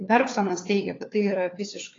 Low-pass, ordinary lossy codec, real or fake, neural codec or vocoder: 7.2 kHz; AAC, 32 kbps; fake; codec, 16 kHz, 4 kbps, FunCodec, trained on LibriTTS, 50 frames a second